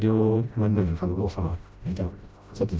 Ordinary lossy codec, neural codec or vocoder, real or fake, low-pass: none; codec, 16 kHz, 0.5 kbps, FreqCodec, smaller model; fake; none